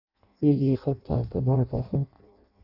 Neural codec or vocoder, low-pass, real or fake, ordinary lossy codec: codec, 16 kHz in and 24 kHz out, 0.6 kbps, FireRedTTS-2 codec; 5.4 kHz; fake; AAC, 32 kbps